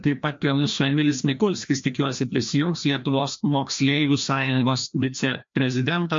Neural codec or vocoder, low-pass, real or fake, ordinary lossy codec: codec, 16 kHz, 1 kbps, FreqCodec, larger model; 7.2 kHz; fake; MP3, 48 kbps